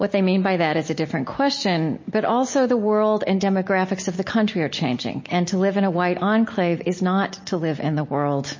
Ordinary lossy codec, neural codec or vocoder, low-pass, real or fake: MP3, 32 kbps; none; 7.2 kHz; real